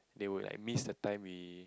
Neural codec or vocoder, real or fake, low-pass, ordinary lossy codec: none; real; none; none